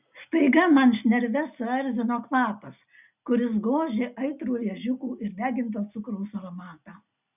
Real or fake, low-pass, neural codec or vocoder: real; 3.6 kHz; none